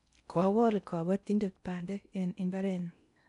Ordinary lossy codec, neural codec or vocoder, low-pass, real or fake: none; codec, 16 kHz in and 24 kHz out, 0.6 kbps, FocalCodec, streaming, 4096 codes; 10.8 kHz; fake